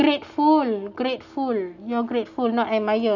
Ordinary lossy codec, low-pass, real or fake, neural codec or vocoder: none; 7.2 kHz; real; none